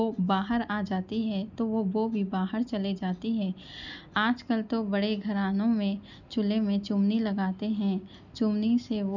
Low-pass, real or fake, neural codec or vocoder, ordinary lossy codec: 7.2 kHz; real; none; none